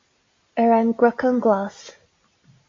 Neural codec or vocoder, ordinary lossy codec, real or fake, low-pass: none; AAC, 32 kbps; real; 7.2 kHz